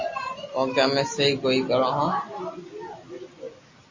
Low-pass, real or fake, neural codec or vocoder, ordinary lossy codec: 7.2 kHz; real; none; MP3, 32 kbps